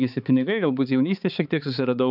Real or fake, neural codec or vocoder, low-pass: fake; codec, 16 kHz, 4 kbps, X-Codec, HuBERT features, trained on balanced general audio; 5.4 kHz